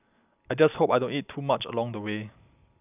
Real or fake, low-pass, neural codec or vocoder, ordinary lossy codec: real; 3.6 kHz; none; none